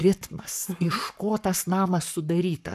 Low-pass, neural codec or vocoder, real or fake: 14.4 kHz; codec, 44.1 kHz, 7.8 kbps, Pupu-Codec; fake